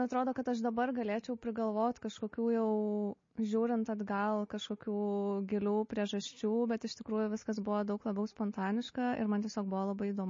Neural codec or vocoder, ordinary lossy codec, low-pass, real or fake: none; MP3, 32 kbps; 7.2 kHz; real